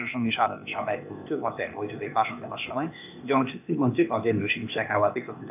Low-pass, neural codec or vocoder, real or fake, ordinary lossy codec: 3.6 kHz; codec, 16 kHz, 0.8 kbps, ZipCodec; fake; none